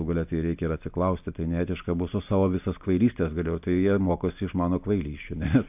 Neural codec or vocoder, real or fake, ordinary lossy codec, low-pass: none; real; AAC, 32 kbps; 3.6 kHz